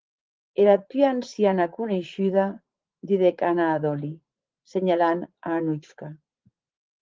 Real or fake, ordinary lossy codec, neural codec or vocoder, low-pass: fake; Opus, 16 kbps; vocoder, 44.1 kHz, 80 mel bands, Vocos; 7.2 kHz